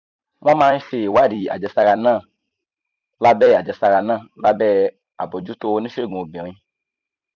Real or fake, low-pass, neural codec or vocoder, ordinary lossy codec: fake; 7.2 kHz; vocoder, 44.1 kHz, 128 mel bands every 256 samples, BigVGAN v2; none